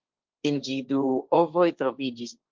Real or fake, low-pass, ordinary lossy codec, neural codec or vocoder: fake; 7.2 kHz; Opus, 24 kbps; codec, 16 kHz, 1.1 kbps, Voila-Tokenizer